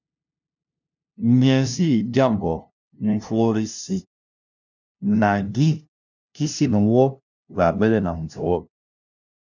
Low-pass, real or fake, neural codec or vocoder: 7.2 kHz; fake; codec, 16 kHz, 0.5 kbps, FunCodec, trained on LibriTTS, 25 frames a second